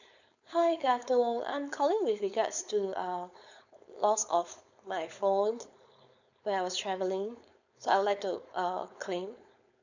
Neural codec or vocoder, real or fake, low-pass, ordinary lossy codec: codec, 16 kHz, 4.8 kbps, FACodec; fake; 7.2 kHz; none